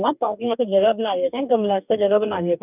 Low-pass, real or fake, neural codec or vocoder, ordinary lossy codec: 3.6 kHz; fake; codec, 44.1 kHz, 2.6 kbps, DAC; none